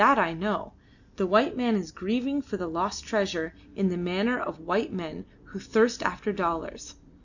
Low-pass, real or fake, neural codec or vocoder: 7.2 kHz; real; none